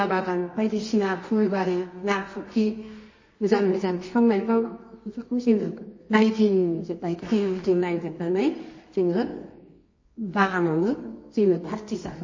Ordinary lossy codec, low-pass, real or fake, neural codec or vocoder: MP3, 32 kbps; 7.2 kHz; fake; codec, 24 kHz, 0.9 kbps, WavTokenizer, medium music audio release